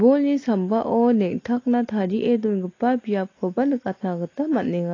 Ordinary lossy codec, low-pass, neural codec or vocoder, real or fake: AAC, 32 kbps; 7.2 kHz; none; real